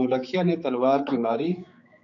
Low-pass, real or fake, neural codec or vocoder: 7.2 kHz; fake; codec, 16 kHz, 4 kbps, X-Codec, HuBERT features, trained on general audio